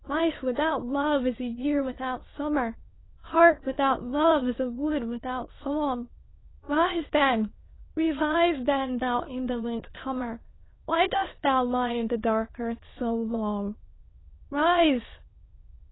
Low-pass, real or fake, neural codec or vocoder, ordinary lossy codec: 7.2 kHz; fake; autoencoder, 22.05 kHz, a latent of 192 numbers a frame, VITS, trained on many speakers; AAC, 16 kbps